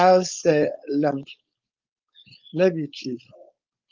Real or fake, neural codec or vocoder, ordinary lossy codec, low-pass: fake; codec, 16 kHz, 4.8 kbps, FACodec; Opus, 32 kbps; 7.2 kHz